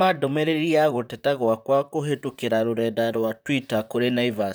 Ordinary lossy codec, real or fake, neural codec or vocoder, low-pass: none; fake; vocoder, 44.1 kHz, 128 mel bands, Pupu-Vocoder; none